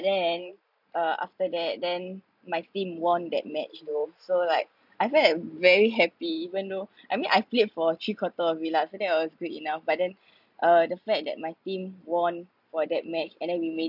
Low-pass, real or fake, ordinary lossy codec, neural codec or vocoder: 5.4 kHz; real; none; none